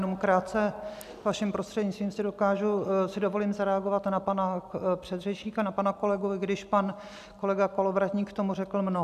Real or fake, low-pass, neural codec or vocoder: real; 14.4 kHz; none